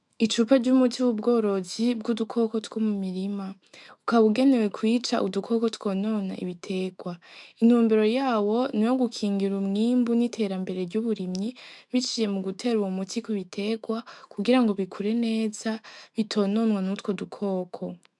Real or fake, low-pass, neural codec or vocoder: fake; 10.8 kHz; autoencoder, 48 kHz, 128 numbers a frame, DAC-VAE, trained on Japanese speech